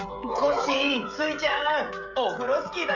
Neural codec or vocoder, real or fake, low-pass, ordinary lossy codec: codec, 16 kHz, 16 kbps, FreqCodec, smaller model; fake; 7.2 kHz; none